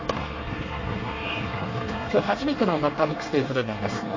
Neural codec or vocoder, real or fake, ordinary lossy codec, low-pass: codec, 24 kHz, 1 kbps, SNAC; fake; MP3, 32 kbps; 7.2 kHz